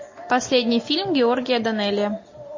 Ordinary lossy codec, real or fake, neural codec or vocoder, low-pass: MP3, 32 kbps; real; none; 7.2 kHz